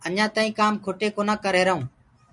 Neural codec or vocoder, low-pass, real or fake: none; 10.8 kHz; real